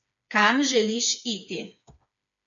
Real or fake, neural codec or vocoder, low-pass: fake; codec, 16 kHz, 4 kbps, FreqCodec, smaller model; 7.2 kHz